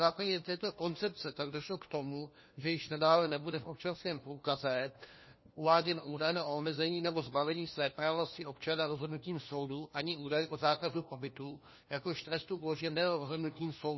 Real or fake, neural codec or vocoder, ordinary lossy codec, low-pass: fake; codec, 16 kHz, 1 kbps, FunCodec, trained on LibriTTS, 50 frames a second; MP3, 24 kbps; 7.2 kHz